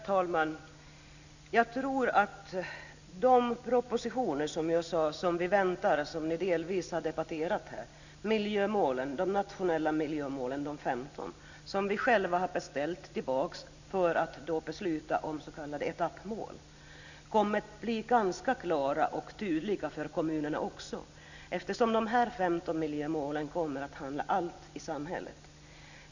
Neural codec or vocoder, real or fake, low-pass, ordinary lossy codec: none; real; 7.2 kHz; none